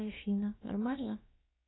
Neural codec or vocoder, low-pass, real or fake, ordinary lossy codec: codec, 16 kHz, about 1 kbps, DyCAST, with the encoder's durations; 7.2 kHz; fake; AAC, 16 kbps